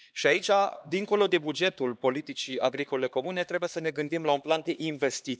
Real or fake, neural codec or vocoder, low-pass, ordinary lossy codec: fake; codec, 16 kHz, 2 kbps, X-Codec, HuBERT features, trained on LibriSpeech; none; none